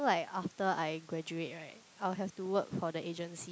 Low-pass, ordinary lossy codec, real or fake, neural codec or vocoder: none; none; real; none